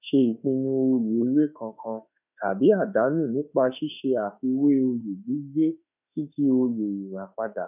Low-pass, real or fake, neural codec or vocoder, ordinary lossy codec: 3.6 kHz; fake; autoencoder, 48 kHz, 32 numbers a frame, DAC-VAE, trained on Japanese speech; none